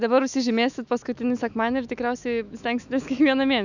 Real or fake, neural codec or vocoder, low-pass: fake; autoencoder, 48 kHz, 128 numbers a frame, DAC-VAE, trained on Japanese speech; 7.2 kHz